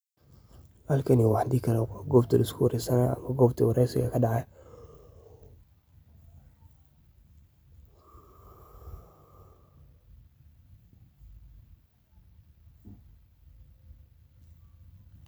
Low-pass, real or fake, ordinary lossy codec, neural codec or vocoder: none; real; none; none